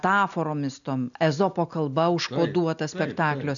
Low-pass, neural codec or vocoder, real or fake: 7.2 kHz; none; real